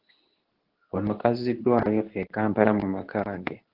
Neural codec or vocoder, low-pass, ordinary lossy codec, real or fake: codec, 24 kHz, 0.9 kbps, WavTokenizer, medium speech release version 2; 5.4 kHz; Opus, 16 kbps; fake